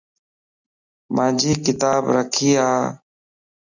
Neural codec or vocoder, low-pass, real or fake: none; 7.2 kHz; real